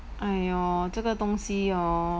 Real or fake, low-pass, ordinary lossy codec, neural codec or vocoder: real; none; none; none